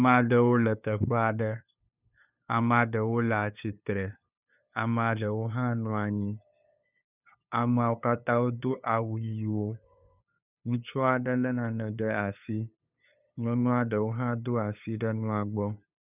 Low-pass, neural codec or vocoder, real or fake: 3.6 kHz; codec, 16 kHz, 2 kbps, FunCodec, trained on LibriTTS, 25 frames a second; fake